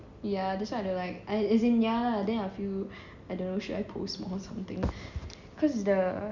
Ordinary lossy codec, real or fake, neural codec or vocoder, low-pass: Opus, 64 kbps; real; none; 7.2 kHz